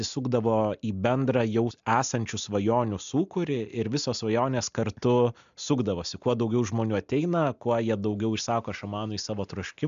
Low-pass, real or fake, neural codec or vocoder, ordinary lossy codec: 7.2 kHz; real; none; MP3, 64 kbps